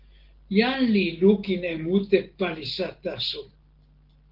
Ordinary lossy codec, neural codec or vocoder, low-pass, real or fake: Opus, 16 kbps; none; 5.4 kHz; real